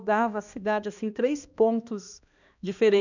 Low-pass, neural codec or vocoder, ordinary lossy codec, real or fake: 7.2 kHz; codec, 16 kHz, 1 kbps, X-Codec, HuBERT features, trained on balanced general audio; none; fake